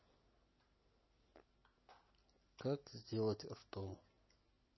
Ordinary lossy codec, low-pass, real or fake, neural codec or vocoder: MP3, 24 kbps; 7.2 kHz; real; none